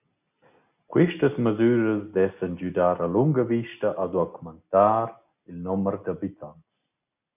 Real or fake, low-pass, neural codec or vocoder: real; 3.6 kHz; none